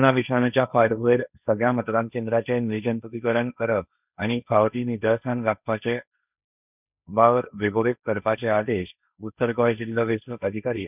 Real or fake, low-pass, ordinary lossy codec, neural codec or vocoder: fake; 3.6 kHz; none; codec, 16 kHz, 1.1 kbps, Voila-Tokenizer